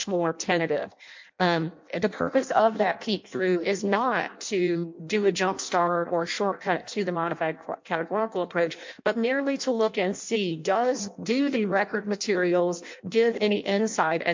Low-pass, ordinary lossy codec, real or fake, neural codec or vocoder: 7.2 kHz; MP3, 48 kbps; fake; codec, 16 kHz in and 24 kHz out, 0.6 kbps, FireRedTTS-2 codec